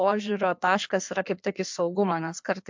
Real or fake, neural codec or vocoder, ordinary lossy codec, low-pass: fake; codec, 16 kHz in and 24 kHz out, 1.1 kbps, FireRedTTS-2 codec; MP3, 64 kbps; 7.2 kHz